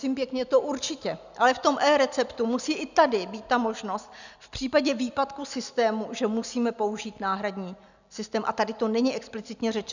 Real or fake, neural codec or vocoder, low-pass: real; none; 7.2 kHz